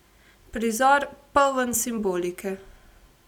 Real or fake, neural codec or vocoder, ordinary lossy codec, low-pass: real; none; none; 19.8 kHz